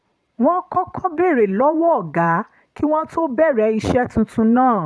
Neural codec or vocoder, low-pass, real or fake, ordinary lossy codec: vocoder, 22.05 kHz, 80 mel bands, Vocos; none; fake; none